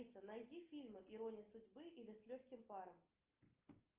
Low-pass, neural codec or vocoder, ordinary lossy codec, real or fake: 3.6 kHz; none; Opus, 32 kbps; real